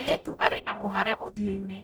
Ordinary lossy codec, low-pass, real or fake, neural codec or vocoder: none; none; fake; codec, 44.1 kHz, 0.9 kbps, DAC